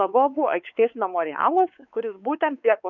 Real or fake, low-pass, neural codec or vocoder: fake; 7.2 kHz; codec, 16 kHz, 4 kbps, X-Codec, WavLM features, trained on Multilingual LibriSpeech